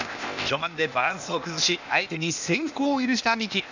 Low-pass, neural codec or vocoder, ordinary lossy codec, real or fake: 7.2 kHz; codec, 16 kHz, 0.8 kbps, ZipCodec; none; fake